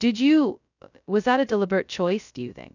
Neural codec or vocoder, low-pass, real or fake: codec, 16 kHz, 0.2 kbps, FocalCodec; 7.2 kHz; fake